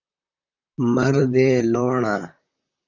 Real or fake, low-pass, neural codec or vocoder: fake; 7.2 kHz; vocoder, 44.1 kHz, 128 mel bands, Pupu-Vocoder